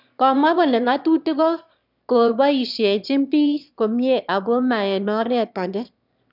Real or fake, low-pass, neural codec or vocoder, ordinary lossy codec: fake; 5.4 kHz; autoencoder, 22.05 kHz, a latent of 192 numbers a frame, VITS, trained on one speaker; none